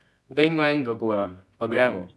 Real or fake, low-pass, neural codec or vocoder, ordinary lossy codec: fake; none; codec, 24 kHz, 0.9 kbps, WavTokenizer, medium music audio release; none